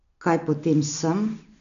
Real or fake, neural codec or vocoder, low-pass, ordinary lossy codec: real; none; 7.2 kHz; none